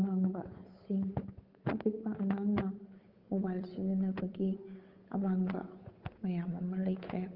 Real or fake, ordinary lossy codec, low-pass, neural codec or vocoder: fake; Opus, 24 kbps; 5.4 kHz; codec, 16 kHz, 8 kbps, FunCodec, trained on Chinese and English, 25 frames a second